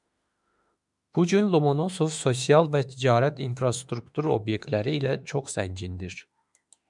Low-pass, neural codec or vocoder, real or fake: 10.8 kHz; autoencoder, 48 kHz, 32 numbers a frame, DAC-VAE, trained on Japanese speech; fake